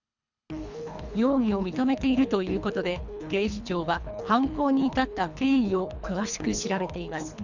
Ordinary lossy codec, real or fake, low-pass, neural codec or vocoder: none; fake; 7.2 kHz; codec, 24 kHz, 3 kbps, HILCodec